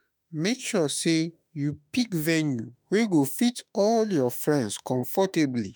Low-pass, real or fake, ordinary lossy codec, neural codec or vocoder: none; fake; none; autoencoder, 48 kHz, 32 numbers a frame, DAC-VAE, trained on Japanese speech